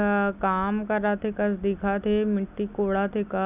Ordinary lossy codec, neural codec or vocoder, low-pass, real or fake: none; none; 3.6 kHz; real